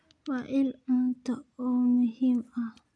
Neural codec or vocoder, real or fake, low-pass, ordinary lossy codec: none; real; 9.9 kHz; none